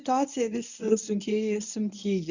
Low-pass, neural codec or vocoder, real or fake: 7.2 kHz; codec, 24 kHz, 0.9 kbps, WavTokenizer, medium speech release version 1; fake